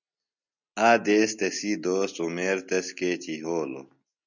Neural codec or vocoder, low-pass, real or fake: none; 7.2 kHz; real